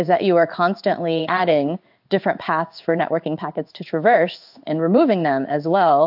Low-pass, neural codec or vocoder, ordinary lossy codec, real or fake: 5.4 kHz; codec, 16 kHz in and 24 kHz out, 1 kbps, XY-Tokenizer; AAC, 48 kbps; fake